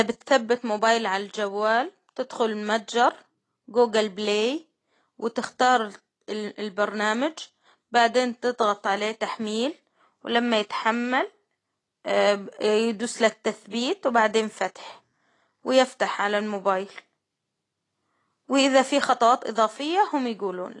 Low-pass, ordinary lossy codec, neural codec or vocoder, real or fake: 10.8 kHz; AAC, 32 kbps; none; real